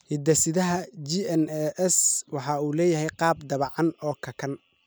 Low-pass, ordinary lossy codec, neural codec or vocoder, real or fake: none; none; none; real